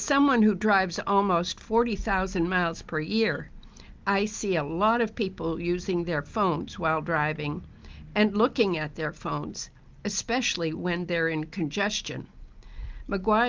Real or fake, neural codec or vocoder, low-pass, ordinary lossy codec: real; none; 7.2 kHz; Opus, 32 kbps